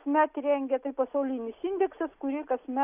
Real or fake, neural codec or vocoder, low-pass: real; none; 3.6 kHz